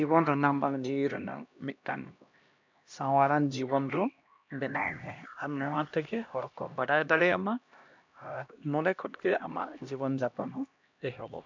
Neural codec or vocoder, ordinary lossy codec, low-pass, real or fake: codec, 16 kHz, 1 kbps, X-Codec, HuBERT features, trained on LibriSpeech; MP3, 64 kbps; 7.2 kHz; fake